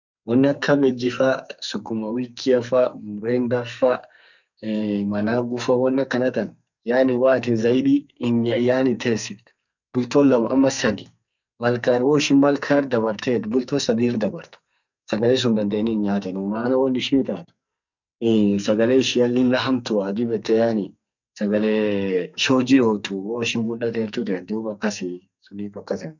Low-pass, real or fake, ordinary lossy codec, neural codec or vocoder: 7.2 kHz; fake; none; codec, 44.1 kHz, 2.6 kbps, SNAC